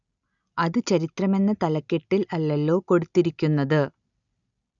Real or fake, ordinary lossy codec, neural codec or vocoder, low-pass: real; none; none; 7.2 kHz